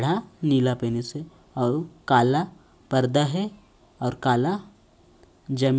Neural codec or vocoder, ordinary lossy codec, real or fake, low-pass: none; none; real; none